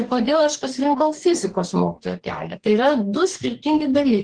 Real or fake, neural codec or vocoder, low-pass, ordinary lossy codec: fake; codec, 44.1 kHz, 2.6 kbps, DAC; 9.9 kHz; Opus, 16 kbps